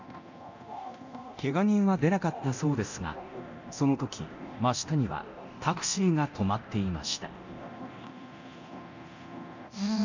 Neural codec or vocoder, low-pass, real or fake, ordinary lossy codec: codec, 24 kHz, 0.9 kbps, DualCodec; 7.2 kHz; fake; none